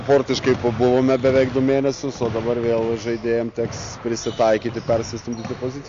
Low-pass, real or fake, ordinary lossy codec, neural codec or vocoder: 7.2 kHz; real; AAC, 48 kbps; none